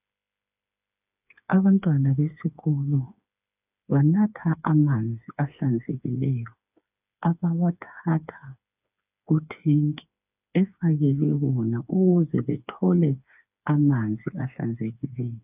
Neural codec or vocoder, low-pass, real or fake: codec, 16 kHz, 4 kbps, FreqCodec, smaller model; 3.6 kHz; fake